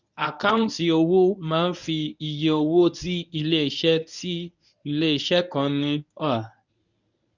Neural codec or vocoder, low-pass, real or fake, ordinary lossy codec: codec, 24 kHz, 0.9 kbps, WavTokenizer, medium speech release version 1; 7.2 kHz; fake; none